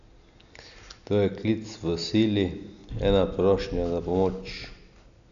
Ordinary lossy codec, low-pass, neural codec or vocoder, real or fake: none; 7.2 kHz; none; real